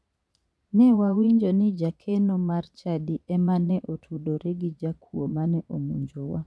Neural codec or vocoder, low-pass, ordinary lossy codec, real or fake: vocoder, 22.05 kHz, 80 mel bands, Vocos; none; none; fake